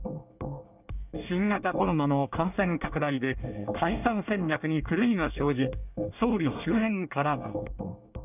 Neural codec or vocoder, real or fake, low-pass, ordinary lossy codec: codec, 24 kHz, 1 kbps, SNAC; fake; 3.6 kHz; none